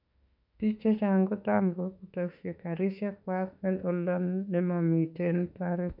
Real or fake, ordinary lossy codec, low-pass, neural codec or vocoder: fake; none; 5.4 kHz; autoencoder, 48 kHz, 32 numbers a frame, DAC-VAE, trained on Japanese speech